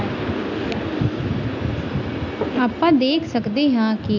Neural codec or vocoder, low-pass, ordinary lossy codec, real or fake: none; 7.2 kHz; none; real